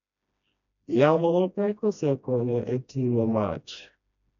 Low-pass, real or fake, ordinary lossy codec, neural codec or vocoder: 7.2 kHz; fake; none; codec, 16 kHz, 1 kbps, FreqCodec, smaller model